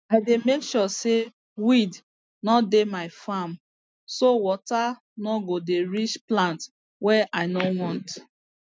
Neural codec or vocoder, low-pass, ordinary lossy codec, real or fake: none; none; none; real